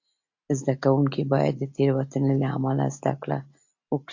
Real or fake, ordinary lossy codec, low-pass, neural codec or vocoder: real; AAC, 48 kbps; 7.2 kHz; none